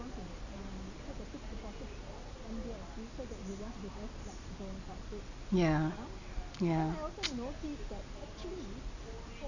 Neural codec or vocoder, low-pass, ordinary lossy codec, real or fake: none; 7.2 kHz; none; real